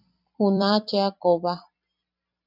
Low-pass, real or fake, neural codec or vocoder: 5.4 kHz; fake; vocoder, 44.1 kHz, 128 mel bands every 256 samples, BigVGAN v2